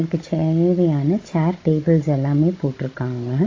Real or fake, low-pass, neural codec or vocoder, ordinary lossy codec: fake; 7.2 kHz; codec, 16 kHz, 16 kbps, FunCodec, trained on Chinese and English, 50 frames a second; AAC, 32 kbps